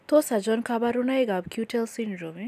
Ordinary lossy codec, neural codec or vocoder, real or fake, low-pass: none; none; real; 14.4 kHz